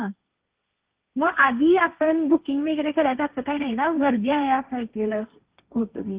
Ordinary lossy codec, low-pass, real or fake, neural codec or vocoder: Opus, 32 kbps; 3.6 kHz; fake; codec, 16 kHz, 1.1 kbps, Voila-Tokenizer